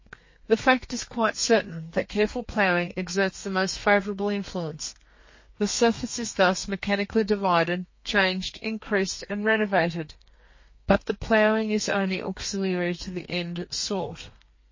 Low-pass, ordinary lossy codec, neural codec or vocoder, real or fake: 7.2 kHz; MP3, 32 kbps; codec, 32 kHz, 1.9 kbps, SNAC; fake